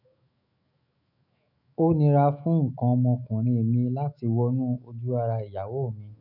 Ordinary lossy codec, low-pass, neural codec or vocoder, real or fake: none; 5.4 kHz; autoencoder, 48 kHz, 128 numbers a frame, DAC-VAE, trained on Japanese speech; fake